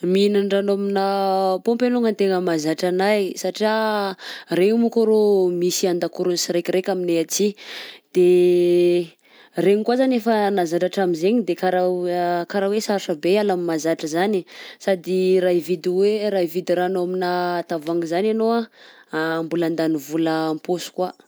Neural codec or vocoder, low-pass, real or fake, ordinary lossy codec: none; none; real; none